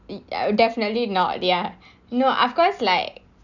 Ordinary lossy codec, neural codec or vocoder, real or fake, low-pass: none; none; real; 7.2 kHz